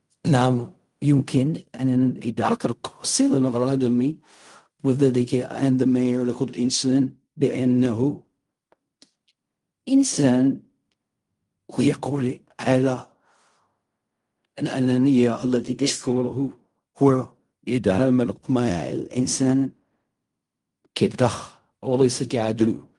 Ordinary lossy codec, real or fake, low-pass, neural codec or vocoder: Opus, 32 kbps; fake; 10.8 kHz; codec, 16 kHz in and 24 kHz out, 0.4 kbps, LongCat-Audio-Codec, fine tuned four codebook decoder